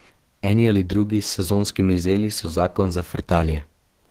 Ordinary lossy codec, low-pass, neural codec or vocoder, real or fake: Opus, 16 kbps; 14.4 kHz; codec, 32 kHz, 1.9 kbps, SNAC; fake